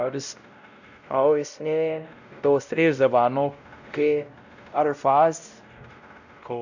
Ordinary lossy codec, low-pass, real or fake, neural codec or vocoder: none; 7.2 kHz; fake; codec, 16 kHz, 0.5 kbps, X-Codec, WavLM features, trained on Multilingual LibriSpeech